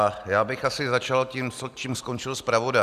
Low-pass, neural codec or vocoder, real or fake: 14.4 kHz; none; real